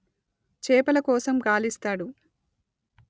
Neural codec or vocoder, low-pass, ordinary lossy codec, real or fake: none; none; none; real